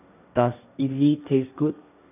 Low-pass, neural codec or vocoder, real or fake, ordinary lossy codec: 3.6 kHz; codec, 16 kHz, 1.1 kbps, Voila-Tokenizer; fake; none